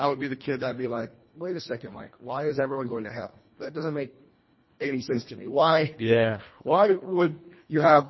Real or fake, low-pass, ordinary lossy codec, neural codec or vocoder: fake; 7.2 kHz; MP3, 24 kbps; codec, 24 kHz, 1.5 kbps, HILCodec